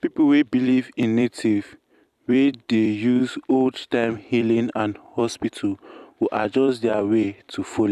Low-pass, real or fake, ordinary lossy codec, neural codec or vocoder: 14.4 kHz; fake; none; vocoder, 44.1 kHz, 128 mel bands every 256 samples, BigVGAN v2